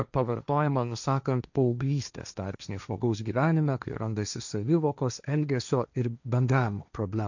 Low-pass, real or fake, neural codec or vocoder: 7.2 kHz; fake; codec, 16 kHz, 1.1 kbps, Voila-Tokenizer